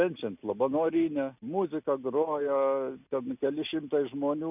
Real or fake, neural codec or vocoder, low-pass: real; none; 3.6 kHz